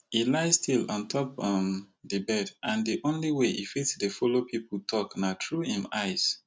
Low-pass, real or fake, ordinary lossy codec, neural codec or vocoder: none; real; none; none